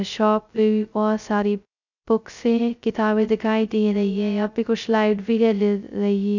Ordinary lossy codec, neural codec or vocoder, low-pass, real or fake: none; codec, 16 kHz, 0.2 kbps, FocalCodec; 7.2 kHz; fake